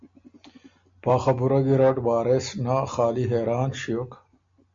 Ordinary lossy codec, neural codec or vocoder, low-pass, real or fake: MP3, 48 kbps; none; 7.2 kHz; real